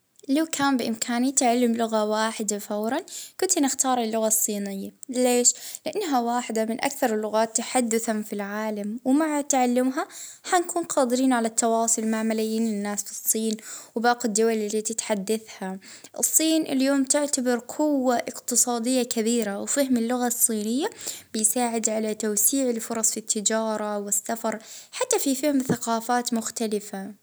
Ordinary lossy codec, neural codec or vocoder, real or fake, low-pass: none; none; real; none